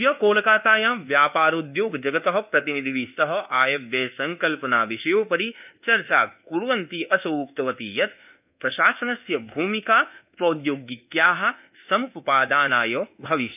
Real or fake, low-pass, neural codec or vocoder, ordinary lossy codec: fake; 3.6 kHz; codec, 24 kHz, 1.2 kbps, DualCodec; none